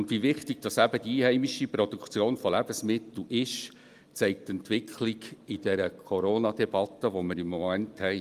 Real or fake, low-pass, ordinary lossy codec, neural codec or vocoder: real; 14.4 kHz; Opus, 32 kbps; none